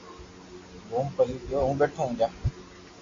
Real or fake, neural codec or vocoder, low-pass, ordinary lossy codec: real; none; 7.2 kHz; AAC, 32 kbps